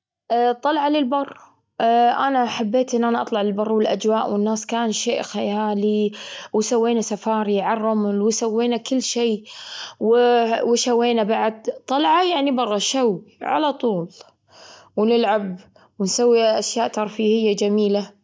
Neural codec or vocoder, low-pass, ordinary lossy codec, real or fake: none; none; none; real